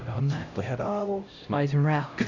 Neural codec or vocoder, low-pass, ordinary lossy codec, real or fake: codec, 16 kHz, 0.5 kbps, X-Codec, HuBERT features, trained on LibriSpeech; 7.2 kHz; none; fake